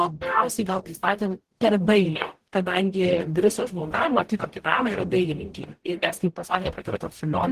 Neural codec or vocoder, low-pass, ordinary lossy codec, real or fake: codec, 44.1 kHz, 0.9 kbps, DAC; 14.4 kHz; Opus, 16 kbps; fake